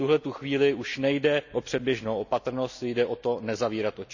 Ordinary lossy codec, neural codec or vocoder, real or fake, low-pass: none; none; real; 7.2 kHz